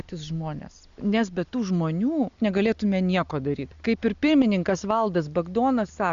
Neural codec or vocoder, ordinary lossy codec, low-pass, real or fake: none; Opus, 64 kbps; 7.2 kHz; real